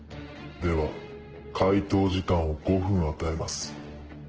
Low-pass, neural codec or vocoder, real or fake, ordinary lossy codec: 7.2 kHz; none; real; Opus, 16 kbps